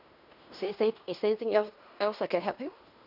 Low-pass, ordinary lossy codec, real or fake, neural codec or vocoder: 5.4 kHz; none; fake; codec, 16 kHz in and 24 kHz out, 0.9 kbps, LongCat-Audio-Codec, fine tuned four codebook decoder